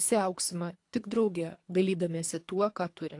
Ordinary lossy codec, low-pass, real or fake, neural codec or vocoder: AAC, 48 kbps; 10.8 kHz; fake; codec, 24 kHz, 3 kbps, HILCodec